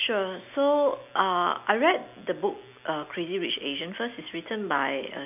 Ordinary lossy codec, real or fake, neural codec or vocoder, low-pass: none; real; none; 3.6 kHz